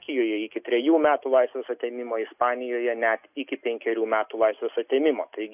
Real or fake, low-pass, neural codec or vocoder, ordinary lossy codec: real; 3.6 kHz; none; AAC, 32 kbps